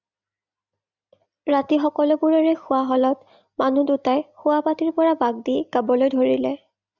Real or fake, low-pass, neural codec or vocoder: real; 7.2 kHz; none